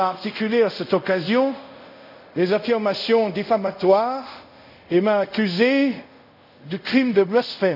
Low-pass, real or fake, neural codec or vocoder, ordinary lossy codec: 5.4 kHz; fake; codec, 24 kHz, 0.5 kbps, DualCodec; none